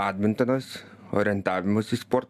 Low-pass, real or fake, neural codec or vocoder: 14.4 kHz; real; none